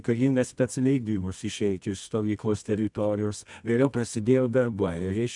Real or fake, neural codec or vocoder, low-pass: fake; codec, 24 kHz, 0.9 kbps, WavTokenizer, medium music audio release; 10.8 kHz